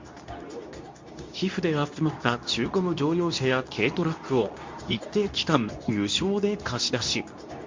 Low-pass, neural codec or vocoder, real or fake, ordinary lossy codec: 7.2 kHz; codec, 24 kHz, 0.9 kbps, WavTokenizer, medium speech release version 2; fake; MP3, 48 kbps